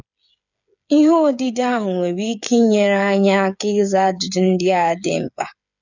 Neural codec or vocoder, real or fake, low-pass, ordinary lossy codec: codec, 16 kHz, 8 kbps, FreqCodec, smaller model; fake; 7.2 kHz; none